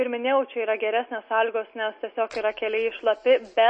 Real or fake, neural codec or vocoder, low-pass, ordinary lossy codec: real; none; 9.9 kHz; MP3, 32 kbps